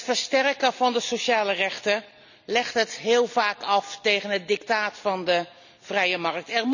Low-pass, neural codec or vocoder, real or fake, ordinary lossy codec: 7.2 kHz; none; real; none